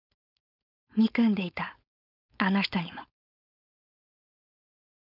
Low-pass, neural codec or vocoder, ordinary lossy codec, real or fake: 5.4 kHz; codec, 16 kHz, 4.8 kbps, FACodec; none; fake